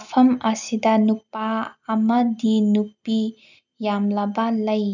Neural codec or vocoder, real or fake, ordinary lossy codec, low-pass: none; real; none; 7.2 kHz